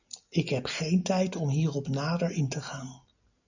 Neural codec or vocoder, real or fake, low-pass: none; real; 7.2 kHz